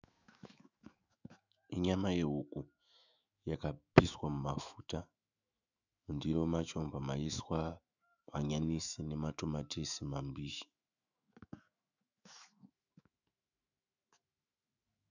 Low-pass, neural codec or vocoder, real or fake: 7.2 kHz; autoencoder, 48 kHz, 128 numbers a frame, DAC-VAE, trained on Japanese speech; fake